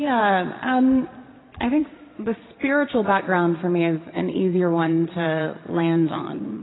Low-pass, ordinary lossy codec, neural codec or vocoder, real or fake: 7.2 kHz; AAC, 16 kbps; vocoder, 22.05 kHz, 80 mel bands, Vocos; fake